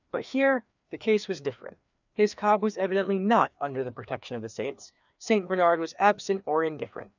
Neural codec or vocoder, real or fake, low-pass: codec, 16 kHz, 2 kbps, FreqCodec, larger model; fake; 7.2 kHz